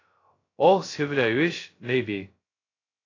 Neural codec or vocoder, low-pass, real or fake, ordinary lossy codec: codec, 16 kHz, 0.2 kbps, FocalCodec; 7.2 kHz; fake; AAC, 32 kbps